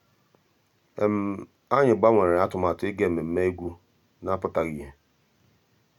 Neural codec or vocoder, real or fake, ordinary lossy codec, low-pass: none; real; none; 19.8 kHz